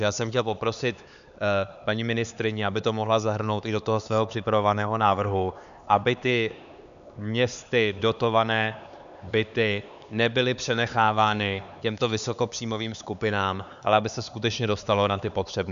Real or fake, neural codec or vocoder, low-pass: fake; codec, 16 kHz, 4 kbps, X-Codec, HuBERT features, trained on LibriSpeech; 7.2 kHz